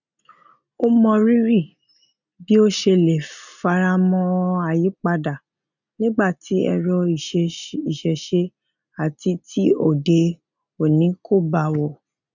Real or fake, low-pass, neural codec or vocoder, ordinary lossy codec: real; 7.2 kHz; none; none